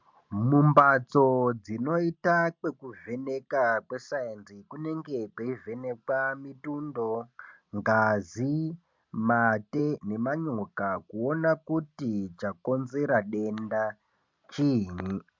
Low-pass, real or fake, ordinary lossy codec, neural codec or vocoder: 7.2 kHz; real; MP3, 64 kbps; none